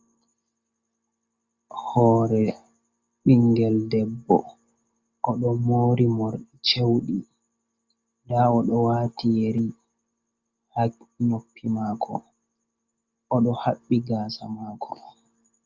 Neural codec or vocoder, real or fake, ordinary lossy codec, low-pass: none; real; Opus, 32 kbps; 7.2 kHz